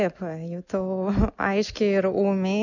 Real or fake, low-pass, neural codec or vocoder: real; 7.2 kHz; none